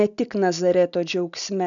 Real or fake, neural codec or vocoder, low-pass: real; none; 7.2 kHz